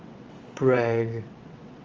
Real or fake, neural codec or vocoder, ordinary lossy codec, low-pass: fake; vocoder, 22.05 kHz, 80 mel bands, WaveNeXt; Opus, 32 kbps; 7.2 kHz